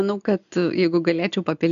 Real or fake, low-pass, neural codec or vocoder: real; 7.2 kHz; none